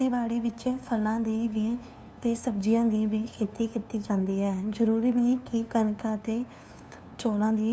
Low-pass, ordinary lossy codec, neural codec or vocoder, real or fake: none; none; codec, 16 kHz, 2 kbps, FunCodec, trained on LibriTTS, 25 frames a second; fake